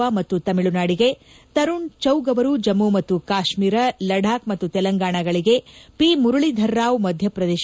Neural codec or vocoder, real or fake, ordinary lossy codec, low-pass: none; real; none; none